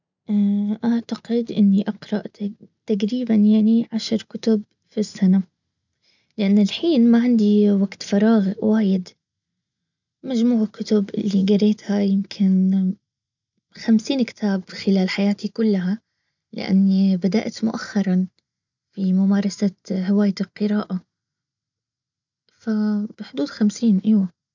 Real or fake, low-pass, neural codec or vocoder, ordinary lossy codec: real; 7.2 kHz; none; none